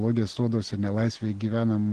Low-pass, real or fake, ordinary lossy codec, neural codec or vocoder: 9.9 kHz; real; Opus, 16 kbps; none